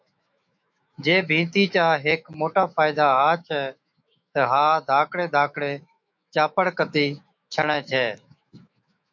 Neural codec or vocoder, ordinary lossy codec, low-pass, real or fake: autoencoder, 48 kHz, 128 numbers a frame, DAC-VAE, trained on Japanese speech; MP3, 48 kbps; 7.2 kHz; fake